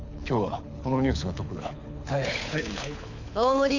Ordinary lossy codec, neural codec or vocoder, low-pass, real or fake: none; codec, 24 kHz, 6 kbps, HILCodec; 7.2 kHz; fake